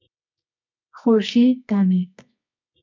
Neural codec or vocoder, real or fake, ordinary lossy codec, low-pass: codec, 24 kHz, 0.9 kbps, WavTokenizer, medium music audio release; fake; MP3, 64 kbps; 7.2 kHz